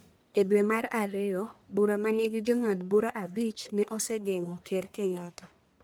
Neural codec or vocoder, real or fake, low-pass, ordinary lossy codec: codec, 44.1 kHz, 1.7 kbps, Pupu-Codec; fake; none; none